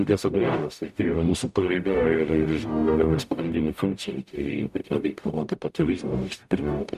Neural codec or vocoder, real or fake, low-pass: codec, 44.1 kHz, 0.9 kbps, DAC; fake; 14.4 kHz